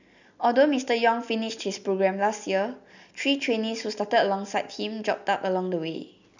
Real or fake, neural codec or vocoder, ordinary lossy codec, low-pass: real; none; none; 7.2 kHz